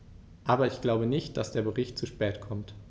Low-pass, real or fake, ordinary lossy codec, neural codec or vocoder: none; real; none; none